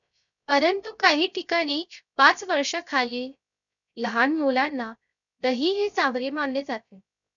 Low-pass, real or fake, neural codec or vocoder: 7.2 kHz; fake; codec, 16 kHz, 0.3 kbps, FocalCodec